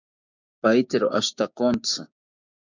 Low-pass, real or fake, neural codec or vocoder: 7.2 kHz; fake; codec, 16 kHz, 6 kbps, DAC